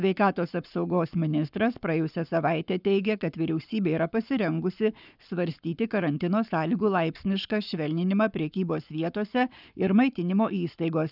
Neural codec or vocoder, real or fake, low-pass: codec, 44.1 kHz, 7.8 kbps, Pupu-Codec; fake; 5.4 kHz